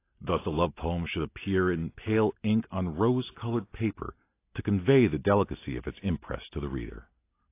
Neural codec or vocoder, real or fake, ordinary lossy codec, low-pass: none; real; AAC, 24 kbps; 3.6 kHz